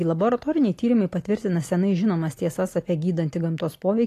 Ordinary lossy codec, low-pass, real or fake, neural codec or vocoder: AAC, 48 kbps; 14.4 kHz; real; none